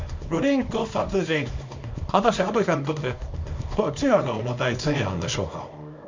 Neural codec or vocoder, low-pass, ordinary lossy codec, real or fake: codec, 24 kHz, 0.9 kbps, WavTokenizer, small release; 7.2 kHz; MP3, 64 kbps; fake